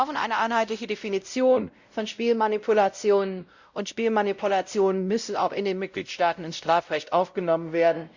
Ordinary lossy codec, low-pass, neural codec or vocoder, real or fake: Opus, 64 kbps; 7.2 kHz; codec, 16 kHz, 0.5 kbps, X-Codec, WavLM features, trained on Multilingual LibriSpeech; fake